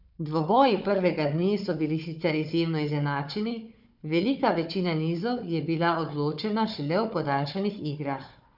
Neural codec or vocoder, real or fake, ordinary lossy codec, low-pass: codec, 16 kHz, 4 kbps, FunCodec, trained on Chinese and English, 50 frames a second; fake; none; 5.4 kHz